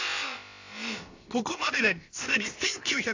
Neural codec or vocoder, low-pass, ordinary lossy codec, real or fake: codec, 16 kHz, about 1 kbps, DyCAST, with the encoder's durations; 7.2 kHz; AAC, 48 kbps; fake